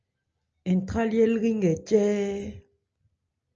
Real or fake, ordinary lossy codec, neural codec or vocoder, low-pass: real; Opus, 24 kbps; none; 7.2 kHz